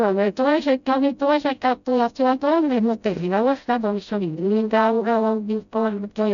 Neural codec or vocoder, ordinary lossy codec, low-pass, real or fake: codec, 16 kHz, 0.5 kbps, FreqCodec, smaller model; none; 7.2 kHz; fake